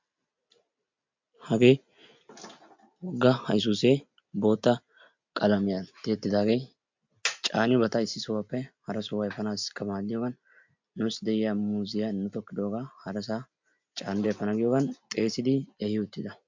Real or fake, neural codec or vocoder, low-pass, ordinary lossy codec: real; none; 7.2 kHz; MP3, 64 kbps